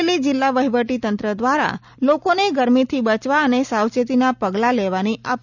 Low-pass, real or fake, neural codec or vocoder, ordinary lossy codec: 7.2 kHz; real; none; none